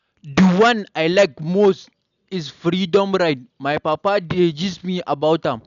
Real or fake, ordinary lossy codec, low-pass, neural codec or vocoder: real; none; 7.2 kHz; none